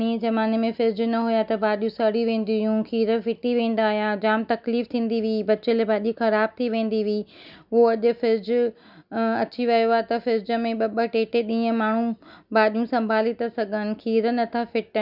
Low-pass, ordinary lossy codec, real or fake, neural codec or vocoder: 5.4 kHz; none; real; none